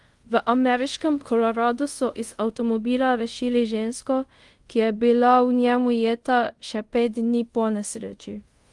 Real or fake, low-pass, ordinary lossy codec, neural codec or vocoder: fake; 10.8 kHz; Opus, 24 kbps; codec, 24 kHz, 0.5 kbps, DualCodec